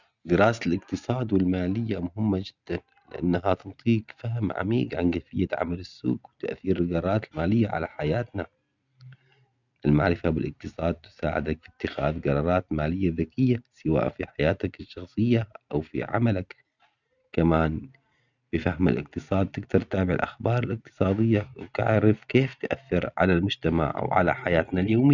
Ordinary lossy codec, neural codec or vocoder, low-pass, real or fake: none; none; 7.2 kHz; real